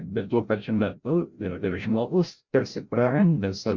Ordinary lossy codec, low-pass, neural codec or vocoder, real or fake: Opus, 64 kbps; 7.2 kHz; codec, 16 kHz, 0.5 kbps, FreqCodec, larger model; fake